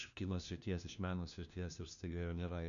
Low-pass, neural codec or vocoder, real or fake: 7.2 kHz; codec, 16 kHz, 1 kbps, FunCodec, trained on LibriTTS, 50 frames a second; fake